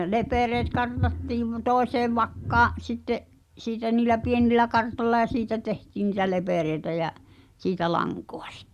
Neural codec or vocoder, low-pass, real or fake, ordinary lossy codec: none; 14.4 kHz; real; Opus, 64 kbps